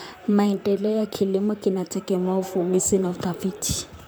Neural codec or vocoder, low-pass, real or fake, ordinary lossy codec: vocoder, 44.1 kHz, 128 mel bands, Pupu-Vocoder; none; fake; none